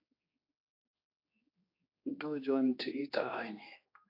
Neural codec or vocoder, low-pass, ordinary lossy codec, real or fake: codec, 16 kHz, 2 kbps, X-Codec, HuBERT features, trained on general audio; 5.4 kHz; AAC, 32 kbps; fake